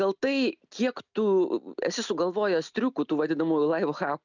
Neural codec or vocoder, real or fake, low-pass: none; real; 7.2 kHz